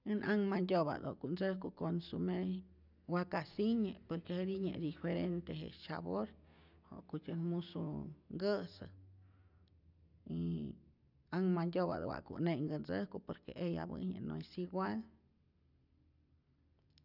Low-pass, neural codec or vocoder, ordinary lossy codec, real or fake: 5.4 kHz; none; none; real